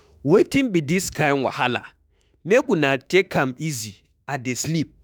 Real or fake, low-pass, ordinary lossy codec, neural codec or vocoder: fake; none; none; autoencoder, 48 kHz, 32 numbers a frame, DAC-VAE, trained on Japanese speech